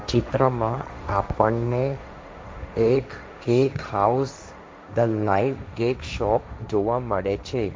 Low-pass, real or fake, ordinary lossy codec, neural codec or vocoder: none; fake; none; codec, 16 kHz, 1.1 kbps, Voila-Tokenizer